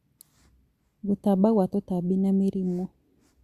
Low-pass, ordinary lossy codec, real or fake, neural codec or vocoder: 14.4 kHz; Opus, 64 kbps; real; none